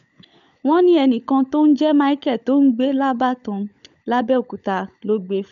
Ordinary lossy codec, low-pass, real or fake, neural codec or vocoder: MP3, 64 kbps; 7.2 kHz; fake; codec, 16 kHz, 16 kbps, FunCodec, trained on LibriTTS, 50 frames a second